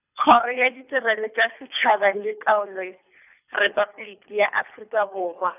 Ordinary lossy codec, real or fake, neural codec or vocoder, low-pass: none; fake; codec, 24 kHz, 3 kbps, HILCodec; 3.6 kHz